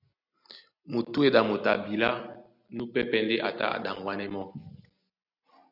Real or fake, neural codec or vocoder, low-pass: real; none; 5.4 kHz